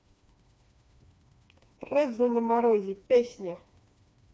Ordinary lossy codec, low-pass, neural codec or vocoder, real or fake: none; none; codec, 16 kHz, 2 kbps, FreqCodec, smaller model; fake